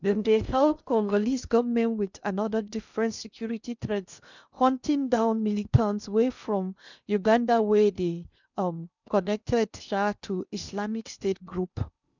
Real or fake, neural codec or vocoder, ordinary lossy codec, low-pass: fake; codec, 16 kHz in and 24 kHz out, 0.6 kbps, FocalCodec, streaming, 2048 codes; none; 7.2 kHz